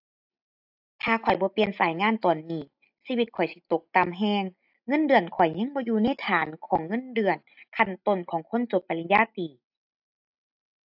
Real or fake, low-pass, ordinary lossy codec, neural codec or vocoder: real; 5.4 kHz; AAC, 48 kbps; none